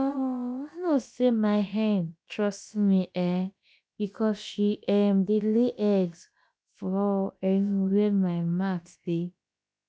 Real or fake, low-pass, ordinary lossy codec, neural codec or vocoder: fake; none; none; codec, 16 kHz, about 1 kbps, DyCAST, with the encoder's durations